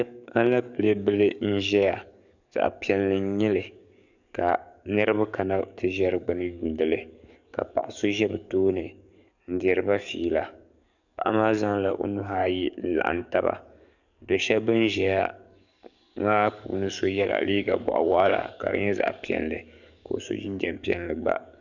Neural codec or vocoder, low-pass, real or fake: codec, 44.1 kHz, 7.8 kbps, DAC; 7.2 kHz; fake